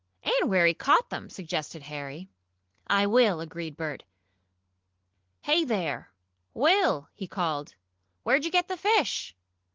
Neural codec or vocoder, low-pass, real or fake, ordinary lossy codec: none; 7.2 kHz; real; Opus, 16 kbps